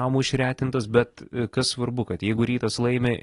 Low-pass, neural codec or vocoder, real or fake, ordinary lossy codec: 10.8 kHz; none; real; AAC, 32 kbps